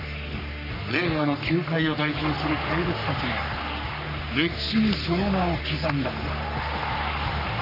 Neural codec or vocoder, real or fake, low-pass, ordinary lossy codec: codec, 44.1 kHz, 3.4 kbps, Pupu-Codec; fake; 5.4 kHz; none